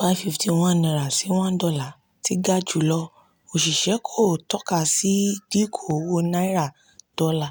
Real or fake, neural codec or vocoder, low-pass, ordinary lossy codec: real; none; none; none